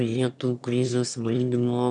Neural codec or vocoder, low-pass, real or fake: autoencoder, 22.05 kHz, a latent of 192 numbers a frame, VITS, trained on one speaker; 9.9 kHz; fake